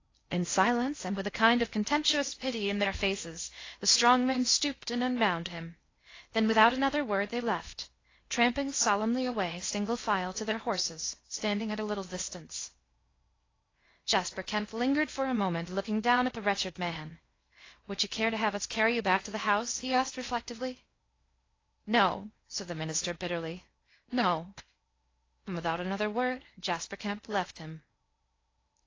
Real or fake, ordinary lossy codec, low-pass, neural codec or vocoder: fake; AAC, 32 kbps; 7.2 kHz; codec, 16 kHz in and 24 kHz out, 0.6 kbps, FocalCodec, streaming, 4096 codes